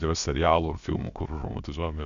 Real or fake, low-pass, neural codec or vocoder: fake; 7.2 kHz; codec, 16 kHz, about 1 kbps, DyCAST, with the encoder's durations